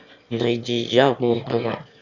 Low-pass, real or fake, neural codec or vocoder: 7.2 kHz; fake; autoencoder, 22.05 kHz, a latent of 192 numbers a frame, VITS, trained on one speaker